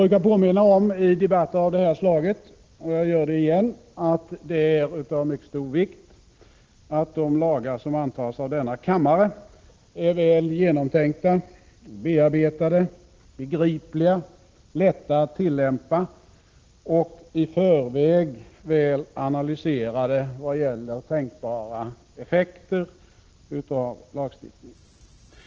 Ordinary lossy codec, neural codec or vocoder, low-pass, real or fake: Opus, 16 kbps; none; 7.2 kHz; real